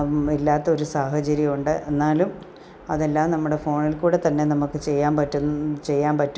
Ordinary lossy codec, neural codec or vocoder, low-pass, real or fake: none; none; none; real